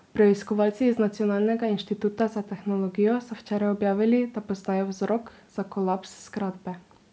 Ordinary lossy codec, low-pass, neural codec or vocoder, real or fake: none; none; none; real